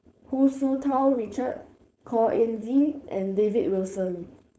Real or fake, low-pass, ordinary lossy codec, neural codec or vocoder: fake; none; none; codec, 16 kHz, 4.8 kbps, FACodec